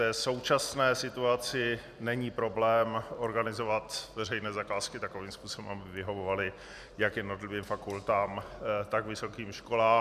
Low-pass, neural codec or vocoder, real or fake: 14.4 kHz; none; real